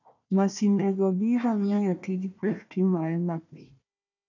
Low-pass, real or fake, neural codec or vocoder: 7.2 kHz; fake; codec, 16 kHz, 1 kbps, FunCodec, trained on Chinese and English, 50 frames a second